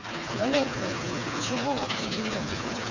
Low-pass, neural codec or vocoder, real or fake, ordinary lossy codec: 7.2 kHz; codec, 16 kHz, 4 kbps, FreqCodec, smaller model; fake; none